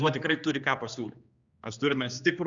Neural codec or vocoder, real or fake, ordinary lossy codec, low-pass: codec, 16 kHz, 2 kbps, X-Codec, HuBERT features, trained on general audio; fake; Opus, 64 kbps; 7.2 kHz